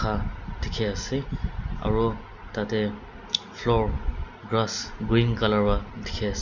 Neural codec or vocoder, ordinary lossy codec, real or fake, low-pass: none; none; real; 7.2 kHz